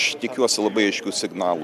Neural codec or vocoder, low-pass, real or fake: none; 14.4 kHz; real